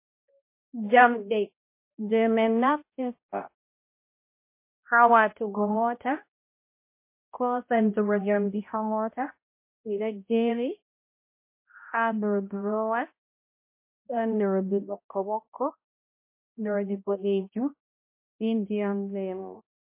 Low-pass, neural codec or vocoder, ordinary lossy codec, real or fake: 3.6 kHz; codec, 16 kHz, 0.5 kbps, X-Codec, HuBERT features, trained on balanced general audio; MP3, 24 kbps; fake